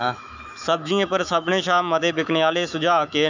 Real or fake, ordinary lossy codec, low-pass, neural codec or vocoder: fake; none; 7.2 kHz; autoencoder, 48 kHz, 128 numbers a frame, DAC-VAE, trained on Japanese speech